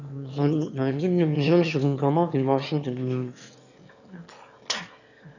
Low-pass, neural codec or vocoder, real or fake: 7.2 kHz; autoencoder, 22.05 kHz, a latent of 192 numbers a frame, VITS, trained on one speaker; fake